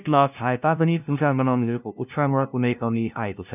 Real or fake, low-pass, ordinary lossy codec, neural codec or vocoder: fake; 3.6 kHz; none; codec, 16 kHz, 0.5 kbps, FunCodec, trained on LibriTTS, 25 frames a second